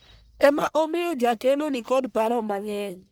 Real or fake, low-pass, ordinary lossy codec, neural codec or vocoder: fake; none; none; codec, 44.1 kHz, 1.7 kbps, Pupu-Codec